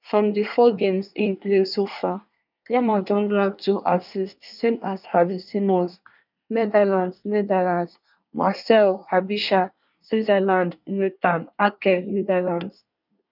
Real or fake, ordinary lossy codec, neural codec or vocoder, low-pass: fake; none; codec, 24 kHz, 1 kbps, SNAC; 5.4 kHz